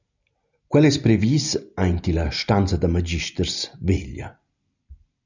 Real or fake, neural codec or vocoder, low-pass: real; none; 7.2 kHz